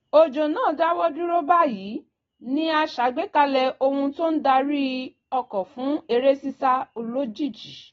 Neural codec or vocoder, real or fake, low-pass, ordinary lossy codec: none; real; 7.2 kHz; AAC, 24 kbps